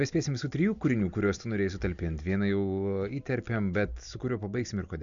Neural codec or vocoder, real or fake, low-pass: none; real; 7.2 kHz